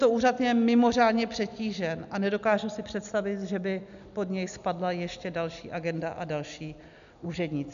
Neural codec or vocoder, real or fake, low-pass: none; real; 7.2 kHz